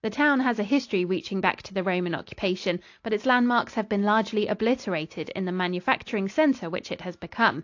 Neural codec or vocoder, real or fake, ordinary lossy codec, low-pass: none; real; AAC, 48 kbps; 7.2 kHz